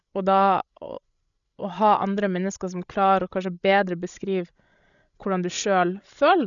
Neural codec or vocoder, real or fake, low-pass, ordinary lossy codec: codec, 16 kHz, 16 kbps, FreqCodec, larger model; fake; 7.2 kHz; none